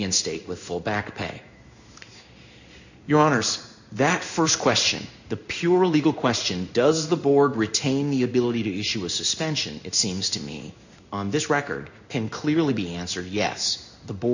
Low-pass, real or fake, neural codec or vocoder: 7.2 kHz; fake; codec, 16 kHz in and 24 kHz out, 1 kbps, XY-Tokenizer